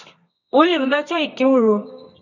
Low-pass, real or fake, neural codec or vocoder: 7.2 kHz; fake; codec, 24 kHz, 0.9 kbps, WavTokenizer, medium music audio release